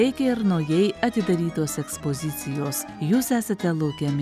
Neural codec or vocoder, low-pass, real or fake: none; 14.4 kHz; real